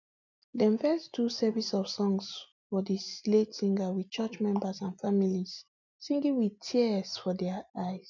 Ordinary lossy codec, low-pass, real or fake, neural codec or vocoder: none; 7.2 kHz; real; none